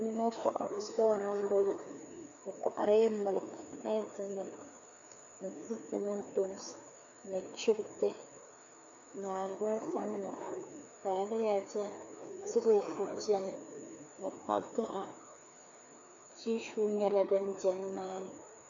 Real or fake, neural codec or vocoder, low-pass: fake; codec, 16 kHz, 2 kbps, FreqCodec, larger model; 7.2 kHz